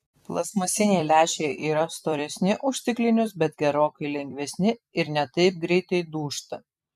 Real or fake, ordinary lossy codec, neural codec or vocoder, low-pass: fake; MP3, 96 kbps; vocoder, 44.1 kHz, 128 mel bands every 256 samples, BigVGAN v2; 14.4 kHz